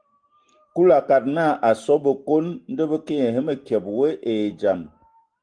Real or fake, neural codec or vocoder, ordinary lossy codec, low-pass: real; none; Opus, 24 kbps; 9.9 kHz